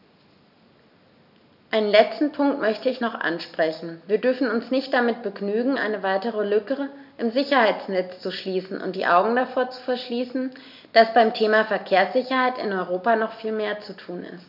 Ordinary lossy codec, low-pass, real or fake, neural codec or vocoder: none; 5.4 kHz; real; none